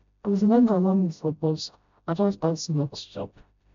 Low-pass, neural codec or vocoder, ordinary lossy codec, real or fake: 7.2 kHz; codec, 16 kHz, 0.5 kbps, FreqCodec, smaller model; MP3, 64 kbps; fake